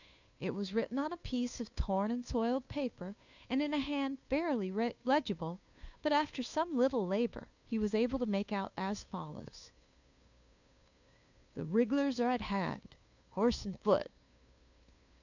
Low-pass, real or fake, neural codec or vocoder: 7.2 kHz; fake; codec, 16 kHz, 2 kbps, FunCodec, trained on Chinese and English, 25 frames a second